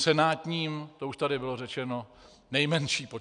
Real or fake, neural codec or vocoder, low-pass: real; none; 9.9 kHz